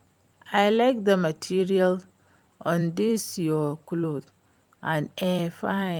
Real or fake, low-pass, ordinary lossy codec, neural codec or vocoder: fake; 19.8 kHz; Opus, 32 kbps; vocoder, 44.1 kHz, 128 mel bands every 256 samples, BigVGAN v2